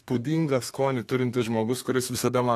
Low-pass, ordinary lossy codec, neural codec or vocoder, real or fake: 14.4 kHz; AAC, 64 kbps; codec, 32 kHz, 1.9 kbps, SNAC; fake